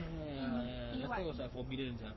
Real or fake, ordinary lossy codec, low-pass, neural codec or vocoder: fake; MP3, 24 kbps; 7.2 kHz; codec, 44.1 kHz, 7.8 kbps, Pupu-Codec